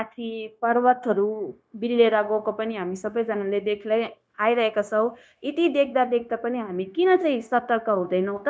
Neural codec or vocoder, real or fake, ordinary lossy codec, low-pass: codec, 16 kHz, 0.9 kbps, LongCat-Audio-Codec; fake; none; none